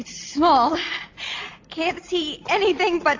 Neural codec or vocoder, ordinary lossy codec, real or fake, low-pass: none; AAC, 48 kbps; real; 7.2 kHz